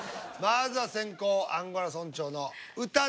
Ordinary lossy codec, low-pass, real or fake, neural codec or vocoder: none; none; real; none